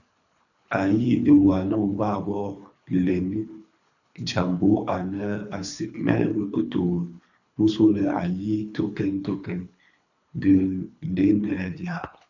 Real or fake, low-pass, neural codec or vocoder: fake; 7.2 kHz; codec, 24 kHz, 3 kbps, HILCodec